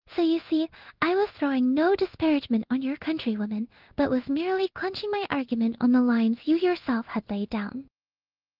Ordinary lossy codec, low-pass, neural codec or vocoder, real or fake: Opus, 32 kbps; 5.4 kHz; codec, 16 kHz in and 24 kHz out, 1 kbps, XY-Tokenizer; fake